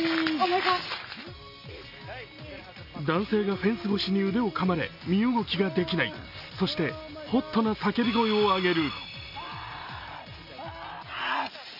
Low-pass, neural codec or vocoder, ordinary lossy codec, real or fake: 5.4 kHz; none; none; real